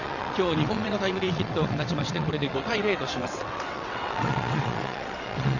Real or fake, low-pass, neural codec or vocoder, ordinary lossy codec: fake; 7.2 kHz; vocoder, 22.05 kHz, 80 mel bands, WaveNeXt; none